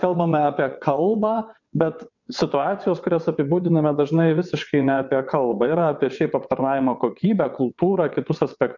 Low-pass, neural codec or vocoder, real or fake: 7.2 kHz; vocoder, 22.05 kHz, 80 mel bands, WaveNeXt; fake